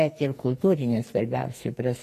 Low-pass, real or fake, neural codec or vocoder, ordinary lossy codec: 14.4 kHz; fake; codec, 44.1 kHz, 2.6 kbps, SNAC; AAC, 48 kbps